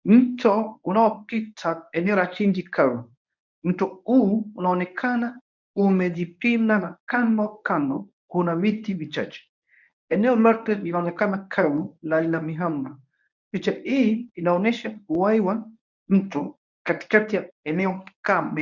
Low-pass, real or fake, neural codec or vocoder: 7.2 kHz; fake; codec, 24 kHz, 0.9 kbps, WavTokenizer, medium speech release version 1